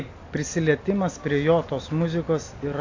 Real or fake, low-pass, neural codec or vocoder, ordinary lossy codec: real; 7.2 kHz; none; AAC, 48 kbps